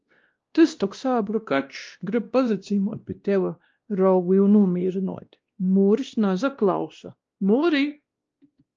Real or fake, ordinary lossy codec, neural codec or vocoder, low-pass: fake; Opus, 24 kbps; codec, 16 kHz, 1 kbps, X-Codec, WavLM features, trained on Multilingual LibriSpeech; 7.2 kHz